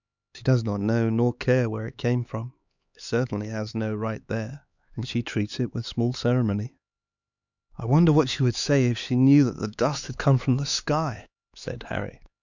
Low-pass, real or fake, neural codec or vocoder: 7.2 kHz; fake; codec, 16 kHz, 4 kbps, X-Codec, HuBERT features, trained on LibriSpeech